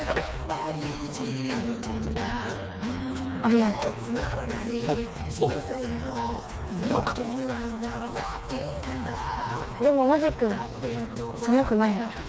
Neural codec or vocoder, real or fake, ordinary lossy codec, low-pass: codec, 16 kHz, 2 kbps, FreqCodec, smaller model; fake; none; none